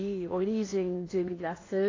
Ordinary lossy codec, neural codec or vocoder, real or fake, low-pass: AAC, 32 kbps; codec, 16 kHz in and 24 kHz out, 0.8 kbps, FocalCodec, streaming, 65536 codes; fake; 7.2 kHz